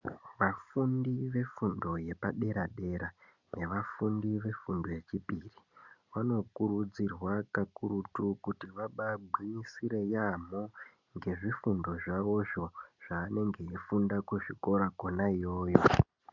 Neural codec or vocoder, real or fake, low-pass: none; real; 7.2 kHz